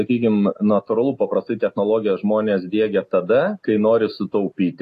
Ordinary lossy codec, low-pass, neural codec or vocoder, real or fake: AAC, 48 kbps; 14.4 kHz; none; real